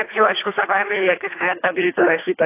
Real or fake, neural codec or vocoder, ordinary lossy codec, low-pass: fake; codec, 24 kHz, 1.5 kbps, HILCodec; AAC, 24 kbps; 3.6 kHz